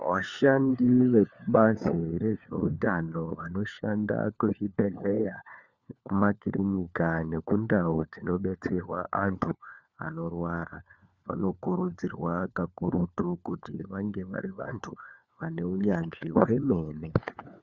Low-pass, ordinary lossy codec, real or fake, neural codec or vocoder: 7.2 kHz; Opus, 64 kbps; fake; codec, 16 kHz, 4 kbps, FunCodec, trained on LibriTTS, 50 frames a second